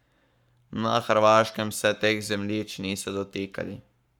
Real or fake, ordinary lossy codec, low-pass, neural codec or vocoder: fake; none; 19.8 kHz; codec, 44.1 kHz, 7.8 kbps, Pupu-Codec